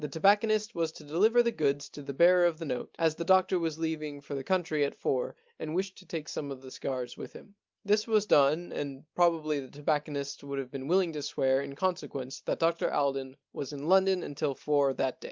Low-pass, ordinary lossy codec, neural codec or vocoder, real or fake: 7.2 kHz; Opus, 24 kbps; none; real